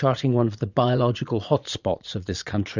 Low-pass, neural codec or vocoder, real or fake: 7.2 kHz; vocoder, 22.05 kHz, 80 mel bands, Vocos; fake